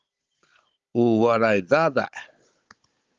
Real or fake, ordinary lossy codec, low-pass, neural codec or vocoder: fake; Opus, 32 kbps; 7.2 kHz; codec, 16 kHz, 16 kbps, FunCodec, trained on Chinese and English, 50 frames a second